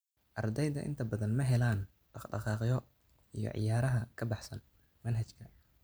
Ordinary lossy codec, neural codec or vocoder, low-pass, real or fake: none; none; none; real